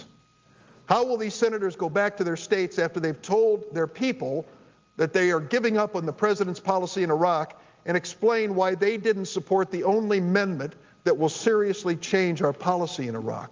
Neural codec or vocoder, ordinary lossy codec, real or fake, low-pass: none; Opus, 32 kbps; real; 7.2 kHz